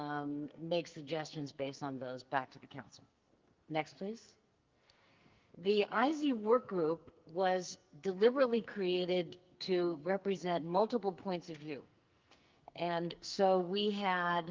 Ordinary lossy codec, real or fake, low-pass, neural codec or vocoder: Opus, 24 kbps; fake; 7.2 kHz; codec, 44.1 kHz, 2.6 kbps, SNAC